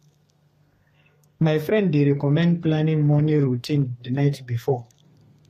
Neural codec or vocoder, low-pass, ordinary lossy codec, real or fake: codec, 32 kHz, 1.9 kbps, SNAC; 14.4 kHz; AAC, 48 kbps; fake